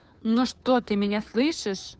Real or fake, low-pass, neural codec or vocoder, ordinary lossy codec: fake; none; codec, 16 kHz, 2 kbps, FunCodec, trained on Chinese and English, 25 frames a second; none